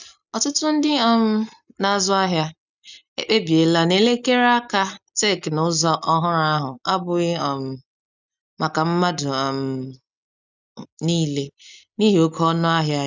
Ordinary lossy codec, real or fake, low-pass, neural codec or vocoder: none; real; 7.2 kHz; none